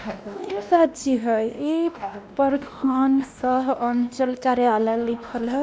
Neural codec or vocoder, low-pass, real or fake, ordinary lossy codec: codec, 16 kHz, 1 kbps, X-Codec, WavLM features, trained on Multilingual LibriSpeech; none; fake; none